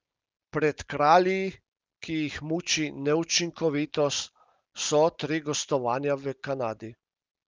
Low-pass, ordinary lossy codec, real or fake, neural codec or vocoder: 7.2 kHz; Opus, 24 kbps; real; none